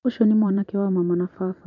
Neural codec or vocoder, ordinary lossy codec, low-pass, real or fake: vocoder, 44.1 kHz, 128 mel bands every 512 samples, BigVGAN v2; none; 7.2 kHz; fake